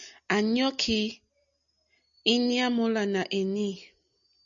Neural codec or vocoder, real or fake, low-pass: none; real; 7.2 kHz